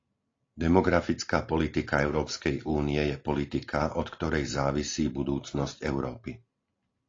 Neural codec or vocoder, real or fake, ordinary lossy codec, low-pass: none; real; AAC, 32 kbps; 7.2 kHz